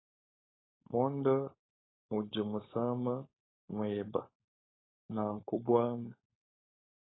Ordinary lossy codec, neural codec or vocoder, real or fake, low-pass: AAC, 16 kbps; codec, 16 kHz, 4.8 kbps, FACodec; fake; 7.2 kHz